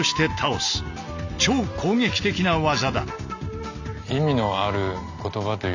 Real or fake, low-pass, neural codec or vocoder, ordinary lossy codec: real; 7.2 kHz; none; none